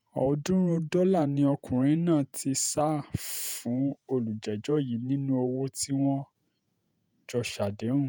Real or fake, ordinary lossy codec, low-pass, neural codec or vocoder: fake; none; none; vocoder, 48 kHz, 128 mel bands, Vocos